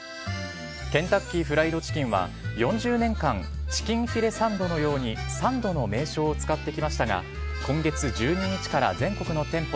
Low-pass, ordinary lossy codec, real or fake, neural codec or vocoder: none; none; real; none